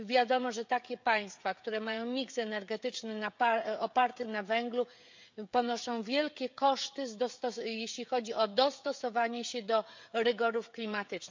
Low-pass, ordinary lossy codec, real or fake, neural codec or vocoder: 7.2 kHz; MP3, 64 kbps; fake; codec, 16 kHz, 16 kbps, FreqCodec, smaller model